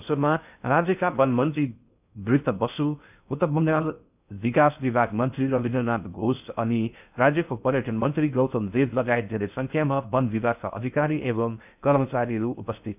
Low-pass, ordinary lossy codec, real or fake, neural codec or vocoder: 3.6 kHz; none; fake; codec, 16 kHz in and 24 kHz out, 0.6 kbps, FocalCodec, streaming, 2048 codes